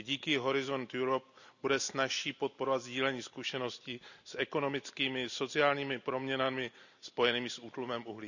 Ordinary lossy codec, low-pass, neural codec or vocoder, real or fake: none; 7.2 kHz; none; real